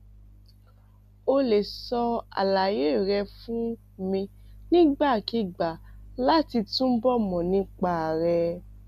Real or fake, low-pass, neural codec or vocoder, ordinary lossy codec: real; 14.4 kHz; none; none